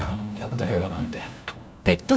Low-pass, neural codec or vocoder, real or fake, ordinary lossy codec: none; codec, 16 kHz, 1 kbps, FunCodec, trained on LibriTTS, 50 frames a second; fake; none